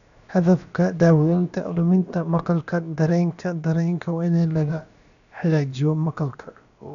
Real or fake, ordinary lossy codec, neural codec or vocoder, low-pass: fake; none; codec, 16 kHz, about 1 kbps, DyCAST, with the encoder's durations; 7.2 kHz